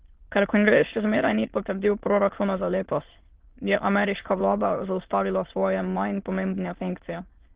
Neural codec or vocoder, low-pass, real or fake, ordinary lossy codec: autoencoder, 22.05 kHz, a latent of 192 numbers a frame, VITS, trained on many speakers; 3.6 kHz; fake; Opus, 16 kbps